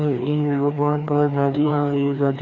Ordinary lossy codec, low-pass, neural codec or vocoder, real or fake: AAC, 48 kbps; 7.2 kHz; codec, 16 kHz, 2 kbps, FreqCodec, larger model; fake